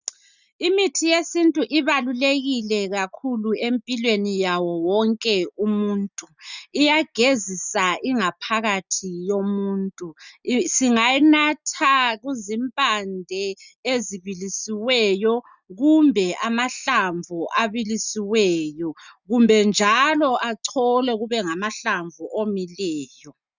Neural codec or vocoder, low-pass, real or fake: none; 7.2 kHz; real